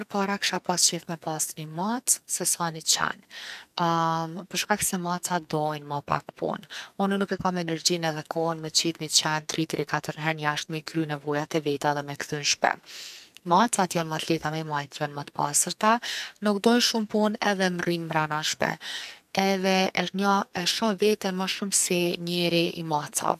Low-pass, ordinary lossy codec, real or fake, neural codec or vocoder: 14.4 kHz; none; fake; codec, 44.1 kHz, 2.6 kbps, SNAC